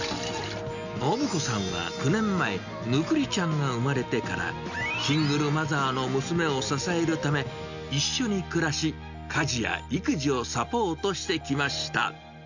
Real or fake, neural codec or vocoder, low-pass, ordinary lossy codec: real; none; 7.2 kHz; none